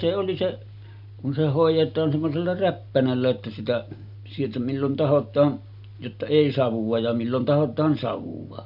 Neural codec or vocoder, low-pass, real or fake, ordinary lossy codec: none; 5.4 kHz; real; none